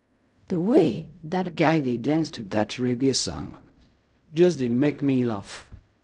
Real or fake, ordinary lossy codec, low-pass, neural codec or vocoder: fake; MP3, 96 kbps; 10.8 kHz; codec, 16 kHz in and 24 kHz out, 0.4 kbps, LongCat-Audio-Codec, fine tuned four codebook decoder